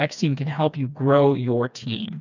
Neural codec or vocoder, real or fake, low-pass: codec, 16 kHz, 2 kbps, FreqCodec, smaller model; fake; 7.2 kHz